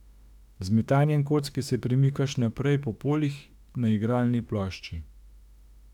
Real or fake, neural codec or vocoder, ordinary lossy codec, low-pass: fake; autoencoder, 48 kHz, 32 numbers a frame, DAC-VAE, trained on Japanese speech; none; 19.8 kHz